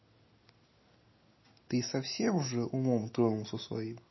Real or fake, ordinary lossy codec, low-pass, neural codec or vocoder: real; MP3, 24 kbps; 7.2 kHz; none